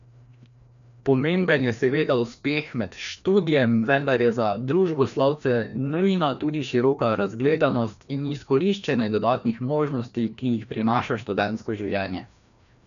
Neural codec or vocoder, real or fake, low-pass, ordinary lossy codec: codec, 16 kHz, 1 kbps, FreqCodec, larger model; fake; 7.2 kHz; none